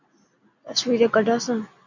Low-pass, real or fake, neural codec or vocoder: 7.2 kHz; real; none